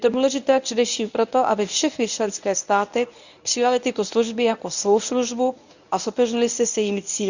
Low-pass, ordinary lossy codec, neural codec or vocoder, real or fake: 7.2 kHz; none; codec, 24 kHz, 0.9 kbps, WavTokenizer, medium speech release version 1; fake